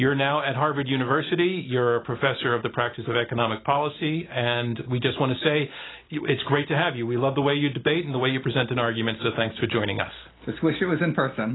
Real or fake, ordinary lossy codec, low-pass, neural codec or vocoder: real; AAC, 16 kbps; 7.2 kHz; none